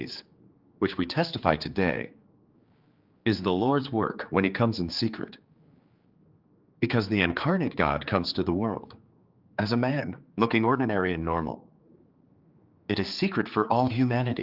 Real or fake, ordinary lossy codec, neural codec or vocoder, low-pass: fake; Opus, 32 kbps; codec, 16 kHz, 4 kbps, X-Codec, HuBERT features, trained on general audio; 5.4 kHz